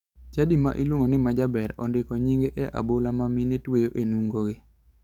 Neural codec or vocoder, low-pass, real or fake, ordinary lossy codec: codec, 44.1 kHz, 7.8 kbps, DAC; 19.8 kHz; fake; none